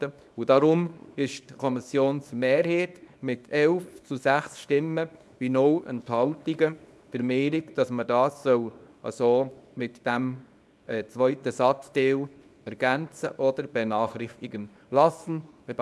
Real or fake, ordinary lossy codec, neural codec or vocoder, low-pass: fake; none; codec, 24 kHz, 0.9 kbps, WavTokenizer, small release; none